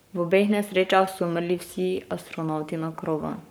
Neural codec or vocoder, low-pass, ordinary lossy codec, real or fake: codec, 44.1 kHz, 7.8 kbps, Pupu-Codec; none; none; fake